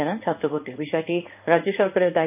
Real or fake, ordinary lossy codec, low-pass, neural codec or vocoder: fake; none; 3.6 kHz; codec, 16 kHz in and 24 kHz out, 1 kbps, XY-Tokenizer